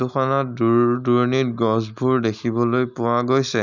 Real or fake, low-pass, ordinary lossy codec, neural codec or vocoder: real; 7.2 kHz; none; none